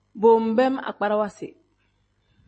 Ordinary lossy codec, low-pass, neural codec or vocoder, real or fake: MP3, 32 kbps; 10.8 kHz; none; real